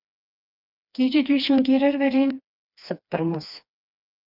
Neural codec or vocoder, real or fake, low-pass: codec, 16 kHz, 2 kbps, FreqCodec, smaller model; fake; 5.4 kHz